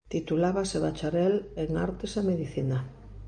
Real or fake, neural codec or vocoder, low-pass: real; none; 9.9 kHz